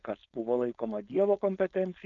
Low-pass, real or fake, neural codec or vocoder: 7.2 kHz; fake; codec, 16 kHz, 4.8 kbps, FACodec